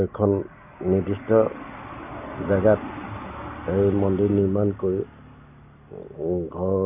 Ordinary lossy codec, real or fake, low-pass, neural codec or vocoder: none; real; 3.6 kHz; none